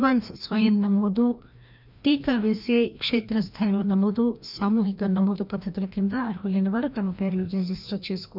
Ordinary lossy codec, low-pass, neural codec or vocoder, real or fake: none; 5.4 kHz; codec, 16 kHz, 1 kbps, FreqCodec, larger model; fake